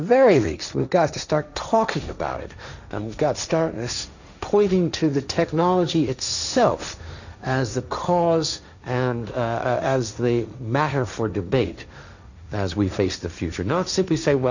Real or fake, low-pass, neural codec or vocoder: fake; 7.2 kHz; codec, 16 kHz, 1.1 kbps, Voila-Tokenizer